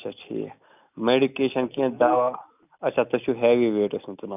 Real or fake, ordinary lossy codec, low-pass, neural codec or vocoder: real; none; 3.6 kHz; none